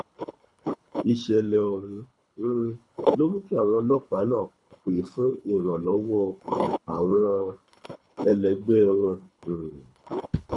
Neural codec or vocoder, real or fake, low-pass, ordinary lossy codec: codec, 24 kHz, 3 kbps, HILCodec; fake; 10.8 kHz; none